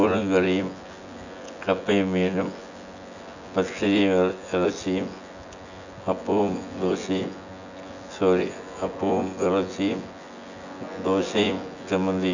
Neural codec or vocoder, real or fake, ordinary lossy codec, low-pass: vocoder, 24 kHz, 100 mel bands, Vocos; fake; none; 7.2 kHz